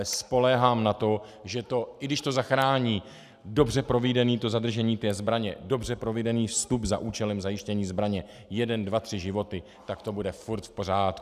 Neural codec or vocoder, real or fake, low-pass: none; real; 14.4 kHz